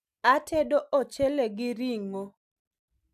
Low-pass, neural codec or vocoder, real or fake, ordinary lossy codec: 14.4 kHz; none; real; AAC, 96 kbps